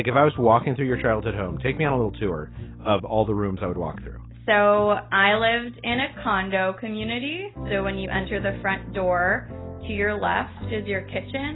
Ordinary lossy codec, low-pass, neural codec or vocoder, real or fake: AAC, 16 kbps; 7.2 kHz; none; real